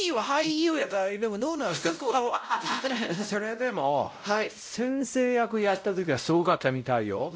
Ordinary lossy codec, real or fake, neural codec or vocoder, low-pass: none; fake; codec, 16 kHz, 0.5 kbps, X-Codec, WavLM features, trained on Multilingual LibriSpeech; none